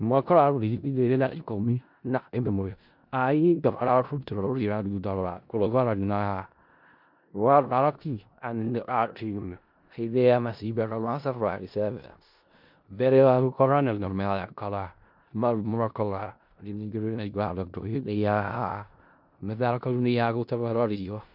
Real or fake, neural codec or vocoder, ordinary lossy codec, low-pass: fake; codec, 16 kHz in and 24 kHz out, 0.4 kbps, LongCat-Audio-Codec, four codebook decoder; MP3, 48 kbps; 5.4 kHz